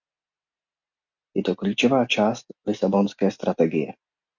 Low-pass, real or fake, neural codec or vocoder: 7.2 kHz; real; none